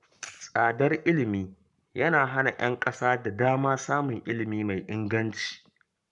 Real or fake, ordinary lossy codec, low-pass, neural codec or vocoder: fake; none; 10.8 kHz; codec, 44.1 kHz, 7.8 kbps, Pupu-Codec